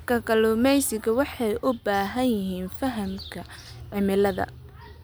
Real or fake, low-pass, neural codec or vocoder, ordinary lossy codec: real; none; none; none